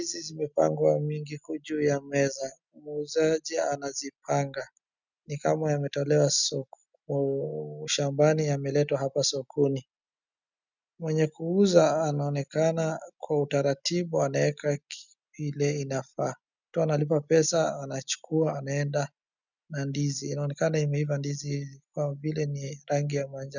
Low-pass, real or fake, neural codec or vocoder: 7.2 kHz; real; none